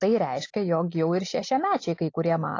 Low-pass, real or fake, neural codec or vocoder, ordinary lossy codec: 7.2 kHz; real; none; AAC, 32 kbps